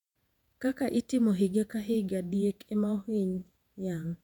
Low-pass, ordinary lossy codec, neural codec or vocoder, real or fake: 19.8 kHz; none; vocoder, 48 kHz, 128 mel bands, Vocos; fake